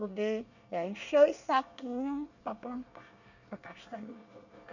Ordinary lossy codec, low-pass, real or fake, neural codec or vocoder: none; 7.2 kHz; fake; codec, 24 kHz, 1 kbps, SNAC